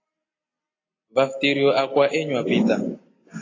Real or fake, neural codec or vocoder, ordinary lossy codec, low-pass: real; none; AAC, 32 kbps; 7.2 kHz